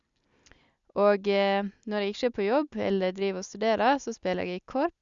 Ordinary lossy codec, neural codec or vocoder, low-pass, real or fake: Opus, 64 kbps; none; 7.2 kHz; real